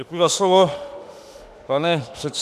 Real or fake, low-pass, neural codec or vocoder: fake; 14.4 kHz; autoencoder, 48 kHz, 32 numbers a frame, DAC-VAE, trained on Japanese speech